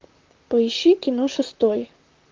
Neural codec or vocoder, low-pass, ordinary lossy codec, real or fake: vocoder, 44.1 kHz, 128 mel bands, Pupu-Vocoder; 7.2 kHz; Opus, 24 kbps; fake